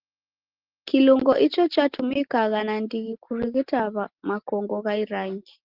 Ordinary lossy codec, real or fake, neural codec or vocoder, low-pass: Opus, 16 kbps; real; none; 5.4 kHz